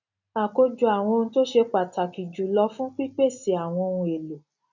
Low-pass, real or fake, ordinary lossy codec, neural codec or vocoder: 7.2 kHz; real; none; none